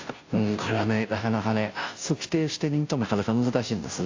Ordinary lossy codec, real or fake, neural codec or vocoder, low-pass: AAC, 48 kbps; fake; codec, 16 kHz, 0.5 kbps, FunCodec, trained on Chinese and English, 25 frames a second; 7.2 kHz